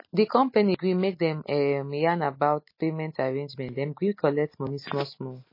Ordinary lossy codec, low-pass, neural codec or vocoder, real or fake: MP3, 24 kbps; 5.4 kHz; none; real